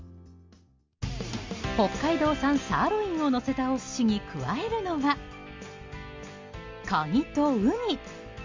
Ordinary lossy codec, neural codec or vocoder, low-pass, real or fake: Opus, 32 kbps; none; 7.2 kHz; real